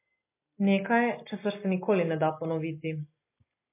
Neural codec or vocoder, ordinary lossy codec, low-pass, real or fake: none; MP3, 24 kbps; 3.6 kHz; real